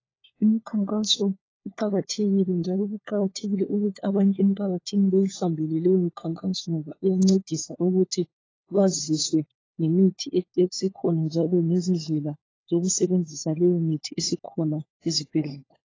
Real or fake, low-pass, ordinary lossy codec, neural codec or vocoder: fake; 7.2 kHz; AAC, 32 kbps; codec, 16 kHz, 4 kbps, FunCodec, trained on LibriTTS, 50 frames a second